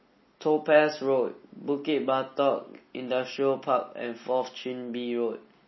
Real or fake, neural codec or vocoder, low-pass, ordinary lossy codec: real; none; 7.2 kHz; MP3, 24 kbps